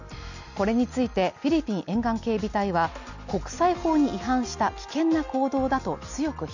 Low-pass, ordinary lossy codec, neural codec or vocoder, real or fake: 7.2 kHz; none; none; real